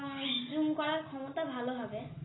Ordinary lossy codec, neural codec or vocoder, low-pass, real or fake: AAC, 16 kbps; none; 7.2 kHz; real